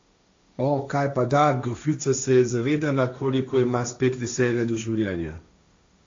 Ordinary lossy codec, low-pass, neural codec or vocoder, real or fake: AAC, 48 kbps; 7.2 kHz; codec, 16 kHz, 1.1 kbps, Voila-Tokenizer; fake